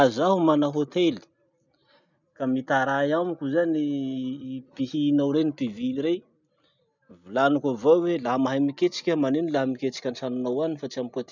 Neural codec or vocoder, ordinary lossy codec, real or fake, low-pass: none; none; real; 7.2 kHz